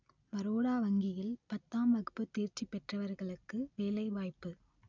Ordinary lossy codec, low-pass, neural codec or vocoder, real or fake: none; 7.2 kHz; none; real